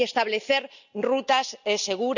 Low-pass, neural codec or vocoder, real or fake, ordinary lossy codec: 7.2 kHz; none; real; none